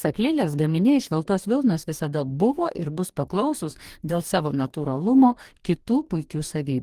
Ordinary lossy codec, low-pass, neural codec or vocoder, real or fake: Opus, 24 kbps; 14.4 kHz; codec, 44.1 kHz, 2.6 kbps, DAC; fake